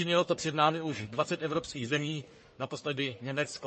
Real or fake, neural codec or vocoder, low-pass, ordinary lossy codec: fake; codec, 44.1 kHz, 1.7 kbps, Pupu-Codec; 10.8 kHz; MP3, 32 kbps